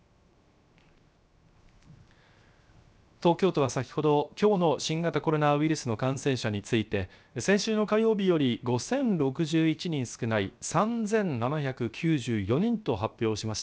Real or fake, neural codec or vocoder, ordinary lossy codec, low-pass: fake; codec, 16 kHz, 0.7 kbps, FocalCodec; none; none